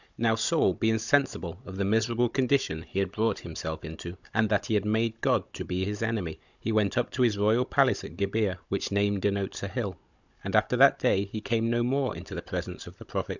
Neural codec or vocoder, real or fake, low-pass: codec, 16 kHz, 16 kbps, FunCodec, trained on Chinese and English, 50 frames a second; fake; 7.2 kHz